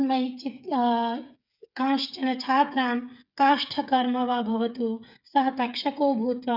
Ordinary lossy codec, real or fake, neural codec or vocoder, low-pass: none; fake; codec, 16 kHz, 8 kbps, FreqCodec, smaller model; 5.4 kHz